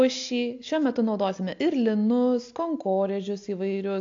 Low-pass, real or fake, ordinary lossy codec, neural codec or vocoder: 7.2 kHz; real; AAC, 48 kbps; none